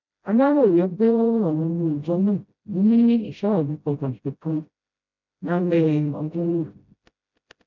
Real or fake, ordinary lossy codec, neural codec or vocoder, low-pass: fake; none; codec, 16 kHz, 0.5 kbps, FreqCodec, smaller model; 7.2 kHz